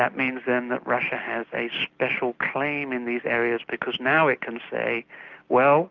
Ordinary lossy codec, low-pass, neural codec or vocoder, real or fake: Opus, 24 kbps; 7.2 kHz; none; real